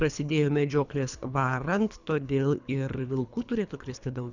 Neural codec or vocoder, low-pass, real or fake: codec, 24 kHz, 3 kbps, HILCodec; 7.2 kHz; fake